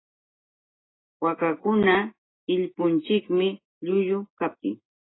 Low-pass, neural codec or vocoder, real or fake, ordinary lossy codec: 7.2 kHz; none; real; AAC, 16 kbps